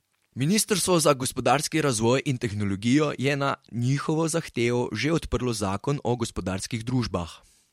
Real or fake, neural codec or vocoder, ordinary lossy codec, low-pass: fake; vocoder, 44.1 kHz, 128 mel bands every 512 samples, BigVGAN v2; MP3, 64 kbps; 19.8 kHz